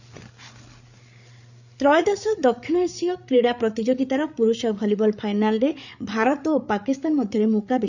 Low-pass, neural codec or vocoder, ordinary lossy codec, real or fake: 7.2 kHz; codec, 16 kHz, 8 kbps, FreqCodec, larger model; none; fake